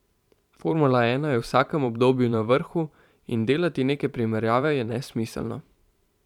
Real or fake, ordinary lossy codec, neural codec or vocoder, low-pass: fake; none; vocoder, 44.1 kHz, 128 mel bands every 512 samples, BigVGAN v2; 19.8 kHz